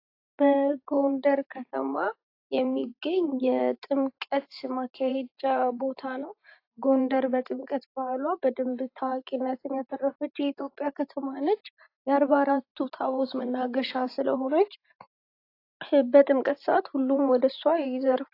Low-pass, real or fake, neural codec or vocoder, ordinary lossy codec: 5.4 kHz; fake; vocoder, 44.1 kHz, 128 mel bands every 512 samples, BigVGAN v2; AAC, 32 kbps